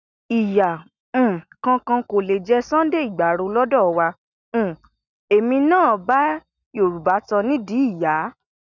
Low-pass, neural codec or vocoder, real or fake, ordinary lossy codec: 7.2 kHz; none; real; none